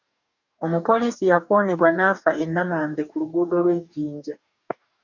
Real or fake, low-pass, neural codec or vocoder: fake; 7.2 kHz; codec, 44.1 kHz, 2.6 kbps, DAC